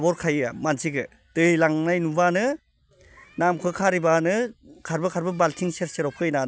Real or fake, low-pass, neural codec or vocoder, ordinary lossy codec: real; none; none; none